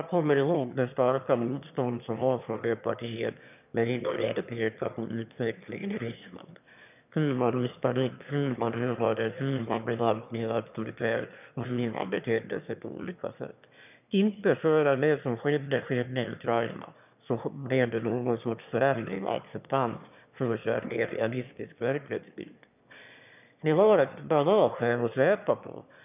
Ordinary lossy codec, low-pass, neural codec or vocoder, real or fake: none; 3.6 kHz; autoencoder, 22.05 kHz, a latent of 192 numbers a frame, VITS, trained on one speaker; fake